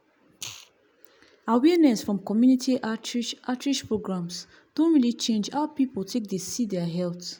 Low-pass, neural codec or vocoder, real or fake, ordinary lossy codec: none; none; real; none